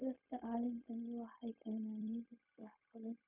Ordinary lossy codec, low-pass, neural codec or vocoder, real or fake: none; 3.6 kHz; codec, 16 kHz, 0.4 kbps, LongCat-Audio-Codec; fake